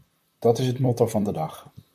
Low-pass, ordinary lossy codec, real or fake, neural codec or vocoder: 14.4 kHz; MP3, 96 kbps; fake; vocoder, 44.1 kHz, 128 mel bands, Pupu-Vocoder